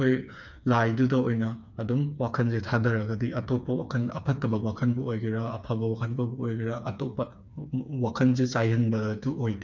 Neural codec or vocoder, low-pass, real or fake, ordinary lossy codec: codec, 16 kHz, 4 kbps, FreqCodec, smaller model; 7.2 kHz; fake; none